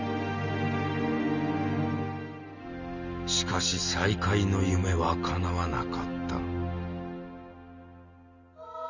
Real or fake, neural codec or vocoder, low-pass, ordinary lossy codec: real; none; 7.2 kHz; none